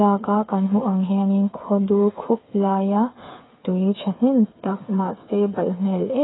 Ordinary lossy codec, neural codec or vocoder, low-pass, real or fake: AAC, 16 kbps; codec, 16 kHz, 8 kbps, FreqCodec, smaller model; 7.2 kHz; fake